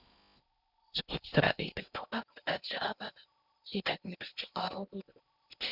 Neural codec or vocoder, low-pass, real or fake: codec, 16 kHz in and 24 kHz out, 0.6 kbps, FocalCodec, streaming, 4096 codes; 5.4 kHz; fake